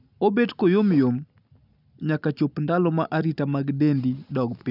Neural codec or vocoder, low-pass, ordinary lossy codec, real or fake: none; 5.4 kHz; none; real